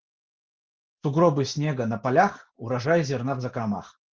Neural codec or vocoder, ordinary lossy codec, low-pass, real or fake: none; Opus, 16 kbps; 7.2 kHz; real